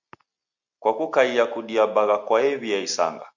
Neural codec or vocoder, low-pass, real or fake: none; 7.2 kHz; real